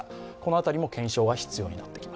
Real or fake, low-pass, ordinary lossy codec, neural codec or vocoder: real; none; none; none